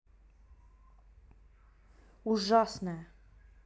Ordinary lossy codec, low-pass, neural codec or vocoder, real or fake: none; none; none; real